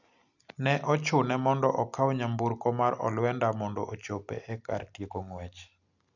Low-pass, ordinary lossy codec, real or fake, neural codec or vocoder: 7.2 kHz; none; real; none